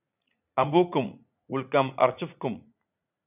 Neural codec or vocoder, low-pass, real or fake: vocoder, 44.1 kHz, 80 mel bands, Vocos; 3.6 kHz; fake